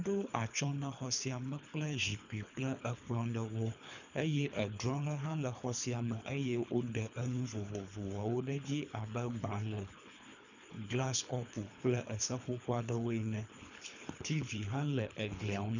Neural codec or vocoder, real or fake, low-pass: codec, 24 kHz, 3 kbps, HILCodec; fake; 7.2 kHz